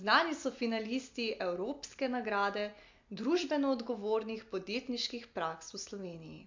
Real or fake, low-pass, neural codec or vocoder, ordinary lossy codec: real; 7.2 kHz; none; MP3, 48 kbps